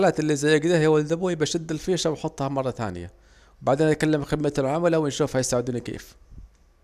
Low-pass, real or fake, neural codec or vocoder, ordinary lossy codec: 14.4 kHz; real; none; none